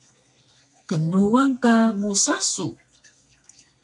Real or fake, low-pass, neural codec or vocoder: fake; 10.8 kHz; codec, 32 kHz, 1.9 kbps, SNAC